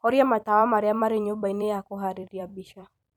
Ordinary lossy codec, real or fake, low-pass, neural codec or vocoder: none; fake; 19.8 kHz; vocoder, 44.1 kHz, 128 mel bands every 256 samples, BigVGAN v2